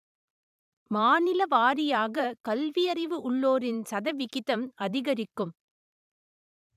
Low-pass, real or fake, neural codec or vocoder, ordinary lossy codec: 14.4 kHz; fake; vocoder, 44.1 kHz, 128 mel bands every 512 samples, BigVGAN v2; none